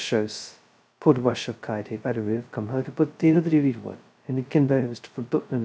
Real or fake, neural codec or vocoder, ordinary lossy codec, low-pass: fake; codec, 16 kHz, 0.2 kbps, FocalCodec; none; none